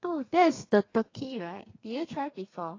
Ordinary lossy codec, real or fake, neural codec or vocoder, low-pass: AAC, 32 kbps; fake; codec, 32 kHz, 1.9 kbps, SNAC; 7.2 kHz